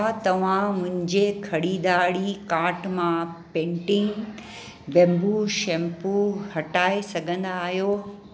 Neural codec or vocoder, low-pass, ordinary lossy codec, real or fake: none; none; none; real